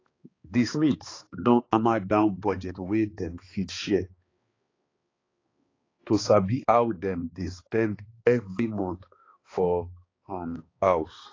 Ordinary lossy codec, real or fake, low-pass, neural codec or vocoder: AAC, 32 kbps; fake; 7.2 kHz; codec, 16 kHz, 2 kbps, X-Codec, HuBERT features, trained on balanced general audio